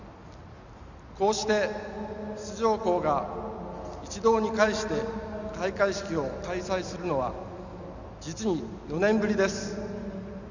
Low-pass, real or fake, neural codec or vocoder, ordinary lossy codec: 7.2 kHz; real; none; none